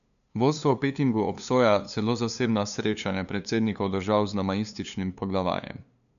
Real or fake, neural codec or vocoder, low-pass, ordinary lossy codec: fake; codec, 16 kHz, 2 kbps, FunCodec, trained on LibriTTS, 25 frames a second; 7.2 kHz; none